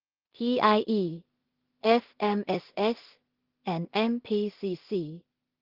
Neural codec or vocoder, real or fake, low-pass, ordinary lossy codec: codec, 16 kHz in and 24 kHz out, 0.4 kbps, LongCat-Audio-Codec, two codebook decoder; fake; 5.4 kHz; Opus, 16 kbps